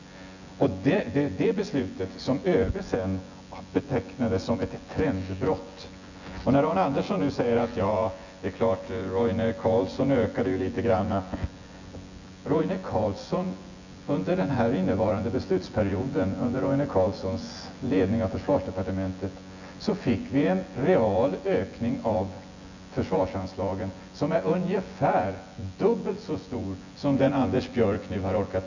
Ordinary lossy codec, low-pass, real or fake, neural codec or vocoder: none; 7.2 kHz; fake; vocoder, 24 kHz, 100 mel bands, Vocos